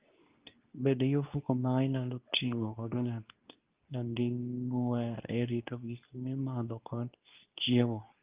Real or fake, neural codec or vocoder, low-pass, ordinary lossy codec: fake; codec, 24 kHz, 0.9 kbps, WavTokenizer, medium speech release version 2; 3.6 kHz; Opus, 24 kbps